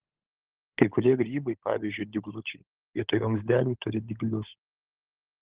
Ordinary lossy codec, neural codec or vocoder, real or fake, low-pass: Opus, 16 kbps; codec, 16 kHz, 16 kbps, FunCodec, trained on LibriTTS, 50 frames a second; fake; 3.6 kHz